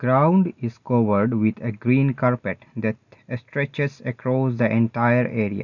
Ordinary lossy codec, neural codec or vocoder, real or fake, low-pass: none; none; real; 7.2 kHz